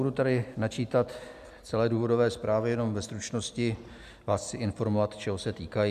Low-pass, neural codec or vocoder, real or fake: 14.4 kHz; none; real